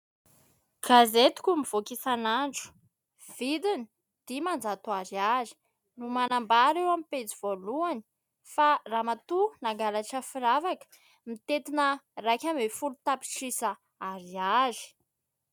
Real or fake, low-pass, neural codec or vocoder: real; 19.8 kHz; none